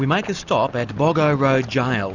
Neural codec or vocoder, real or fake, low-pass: none; real; 7.2 kHz